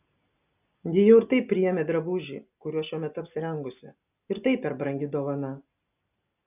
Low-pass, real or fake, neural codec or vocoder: 3.6 kHz; real; none